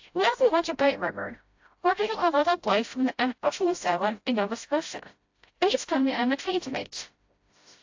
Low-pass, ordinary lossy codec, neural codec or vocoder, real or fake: 7.2 kHz; AAC, 48 kbps; codec, 16 kHz, 0.5 kbps, FreqCodec, smaller model; fake